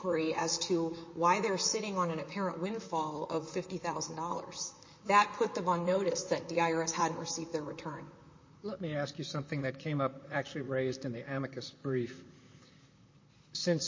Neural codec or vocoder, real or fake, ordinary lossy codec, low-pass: vocoder, 44.1 kHz, 128 mel bands, Pupu-Vocoder; fake; MP3, 32 kbps; 7.2 kHz